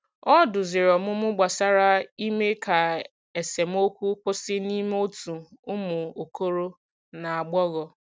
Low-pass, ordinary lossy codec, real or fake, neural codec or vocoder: none; none; real; none